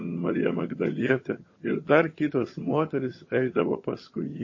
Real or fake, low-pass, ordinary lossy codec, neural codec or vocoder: fake; 7.2 kHz; MP3, 32 kbps; vocoder, 22.05 kHz, 80 mel bands, HiFi-GAN